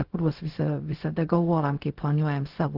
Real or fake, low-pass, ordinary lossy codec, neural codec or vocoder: fake; 5.4 kHz; Opus, 16 kbps; codec, 16 kHz, 0.4 kbps, LongCat-Audio-Codec